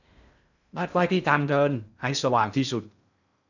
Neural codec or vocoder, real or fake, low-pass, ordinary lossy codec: codec, 16 kHz in and 24 kHz out, 0.6 kbps, FocalCodec, streaming, 4096 codes; fake; 7.2 kHz; none